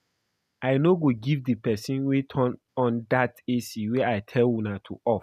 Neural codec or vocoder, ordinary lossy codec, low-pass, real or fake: none; none; 14.4 kHz; real